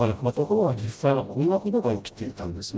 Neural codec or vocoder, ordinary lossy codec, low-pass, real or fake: codec, 16 kHz, 0.5 kbps, FreqCodec, smaller model; none; none; fake